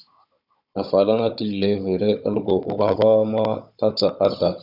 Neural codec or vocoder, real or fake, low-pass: codec, 16 kHz, 16 kbps, FunCodec, trained on Chinese and English, 50 frames a second; fake; 5.4 kHz